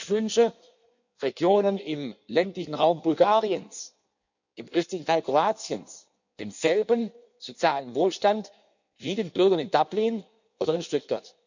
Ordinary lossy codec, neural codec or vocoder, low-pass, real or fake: none; codec, 16 kHz in and 24 kHz out, 1.1 kbps, FireRedTTS-2 codec; 7.2 kHz; fake